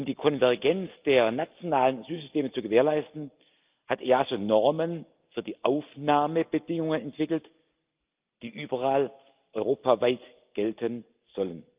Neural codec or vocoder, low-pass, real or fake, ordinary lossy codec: none; 3.6 kHz; real; Opus, 24 kbps